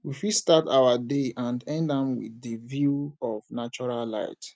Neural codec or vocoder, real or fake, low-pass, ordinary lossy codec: none; real; none; none